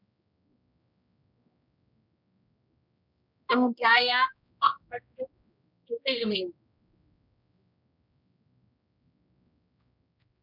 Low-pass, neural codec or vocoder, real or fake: 5.4 kHz; codec, 16 kHz, 1 kbps, X-Codec, HuBERT features, trained on general audio; fake